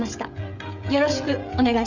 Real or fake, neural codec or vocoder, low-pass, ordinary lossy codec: fake; codec, 16 kHz, 16 kbps, FreqCodec, smaller model; 7.2 kHz; none